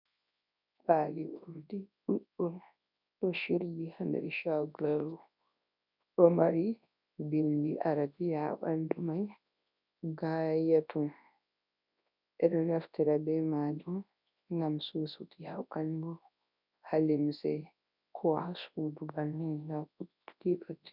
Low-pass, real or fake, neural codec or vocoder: 5.4 kHz; fake; codec, 24 kHz, 0.9 kbps, WavTokenizer, large speech release